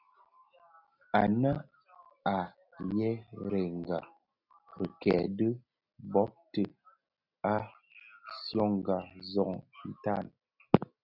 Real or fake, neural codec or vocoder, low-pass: real; none; 5.4 kHz